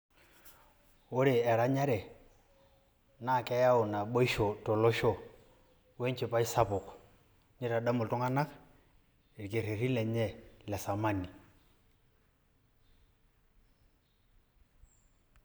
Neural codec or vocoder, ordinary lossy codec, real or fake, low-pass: none; none; real; none